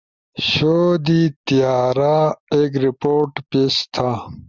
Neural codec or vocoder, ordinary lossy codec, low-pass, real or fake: none; Opus, 64 kbps; 7.2 kHz; real